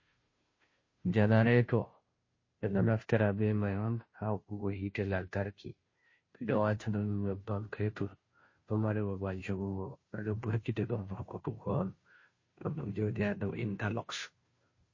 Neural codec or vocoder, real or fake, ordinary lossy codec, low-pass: codec, 16 kHz, 0.5 kbps, FunCodec, trained on Chinese and English, 25 frames a second; fake; MP3, 32 kbps; 7.2 kHz